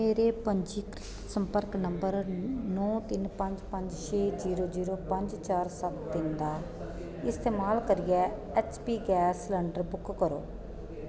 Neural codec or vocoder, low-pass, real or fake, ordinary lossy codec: none; none; real; none